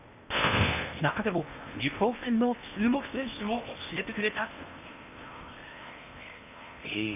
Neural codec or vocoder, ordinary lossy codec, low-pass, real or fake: codec, 16 kHz in and 24 kHz out, 0.8 kbps, FocalCodec, streaming, 65536 codes; none; 3.6 kHz; fake